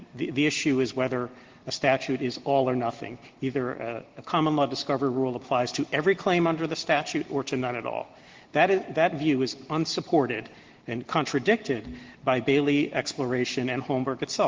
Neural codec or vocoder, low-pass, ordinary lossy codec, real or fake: none; 7.2 kHz; Opus, 16 kbps; real